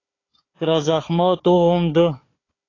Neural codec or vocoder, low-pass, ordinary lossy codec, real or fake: codec, 16 kHz, 4 kbps, FunCodec, trained on Chinese and English, 50 frames a second; 7.2 kHz; AAC, 32 kbps; fake